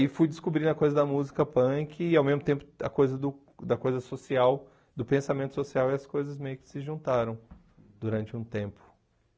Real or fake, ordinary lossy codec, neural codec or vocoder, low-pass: real; none; none; none